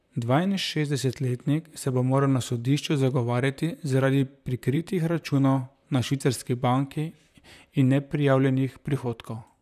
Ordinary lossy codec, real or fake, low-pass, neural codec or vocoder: none; fake; 14.4 kHz; vocoder, 44.1 kHz, 128 mel bands, Pupu-Vocoder